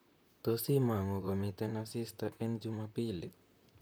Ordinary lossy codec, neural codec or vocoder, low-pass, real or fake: none; vocoder, 44.1 kHz, 128 mel bands, Pupu-Vocoder; none; fake